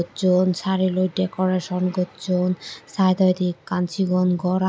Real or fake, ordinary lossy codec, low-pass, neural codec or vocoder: real; none; none; none